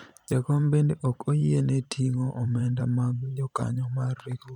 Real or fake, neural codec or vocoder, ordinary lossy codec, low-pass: fake; vocoder, 44.1 kHz, 128 mel bands every 512 samples, BigVGAN v2; none; 19.8 kHz